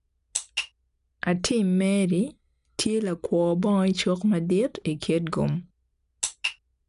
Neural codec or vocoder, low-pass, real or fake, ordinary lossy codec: none; 10.8 kHz; real; none